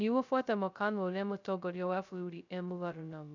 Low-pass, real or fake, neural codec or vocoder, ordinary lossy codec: 7.2 kHz; fake; codec, 16 kHz, 0.2 kbps, FocalCodec; none